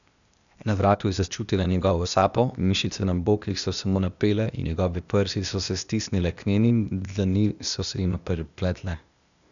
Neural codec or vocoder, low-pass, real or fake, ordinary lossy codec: codec, 16 kHz, 0.8 kbps, ZipCodec; 7.2 kHz; fake; none